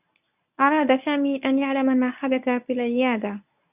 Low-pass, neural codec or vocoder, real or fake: 3.6 kHz; codec, 24 kHz, 0.9 kbps, WavTokenizer, medium speech release version 1; fake